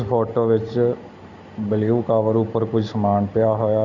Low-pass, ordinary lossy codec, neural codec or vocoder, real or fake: 7.2 kHz; none; none; real